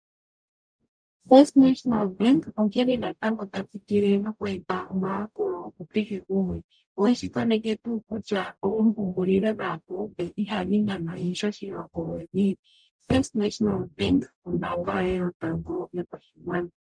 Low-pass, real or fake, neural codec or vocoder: 9.9 kHz; fake; codec, 44.1 kHz, 0.9 kbps, DAC